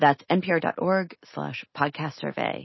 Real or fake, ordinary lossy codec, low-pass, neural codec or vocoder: real; MP3, 24 kbps; 7.2 kHz; none